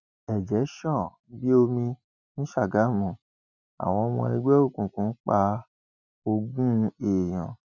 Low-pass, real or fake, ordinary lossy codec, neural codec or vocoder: 7.2 kHz; real; none; none